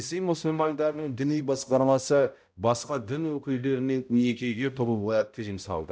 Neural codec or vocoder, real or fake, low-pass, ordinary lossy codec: codec, 16 kHz, 0.5 kbps, X-Codec, HuBERT features, trained on balanced general audio; fake; none; none